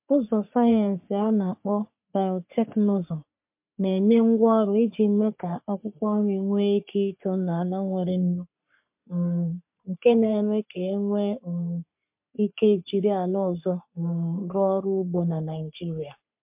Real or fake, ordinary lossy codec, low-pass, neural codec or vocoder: fake; MP3, 32 kbps; 3.6 kHz; codec, 44.1 kHz, 3.4 kbps, Pupu-Codec